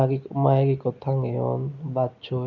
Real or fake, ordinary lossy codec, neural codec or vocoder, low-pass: real; none; none; 7.2 kHz